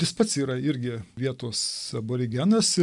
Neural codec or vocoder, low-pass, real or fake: none; 10.8 kHz; real